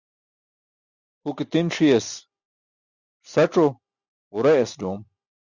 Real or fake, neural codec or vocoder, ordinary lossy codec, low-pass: real; none; Opus, 64 kbps; 7.2 kHz